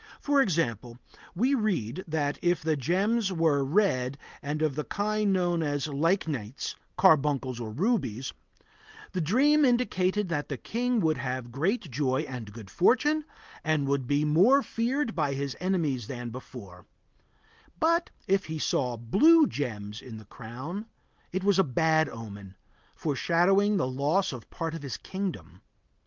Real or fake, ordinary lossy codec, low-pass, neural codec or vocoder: real; Opus, 24 kbps; 7.2 kHz; none